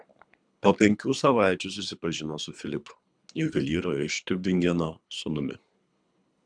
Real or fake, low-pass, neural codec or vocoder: fake; 9.9 kHz; codec, 24 kHz, 3 kbps, HILCodec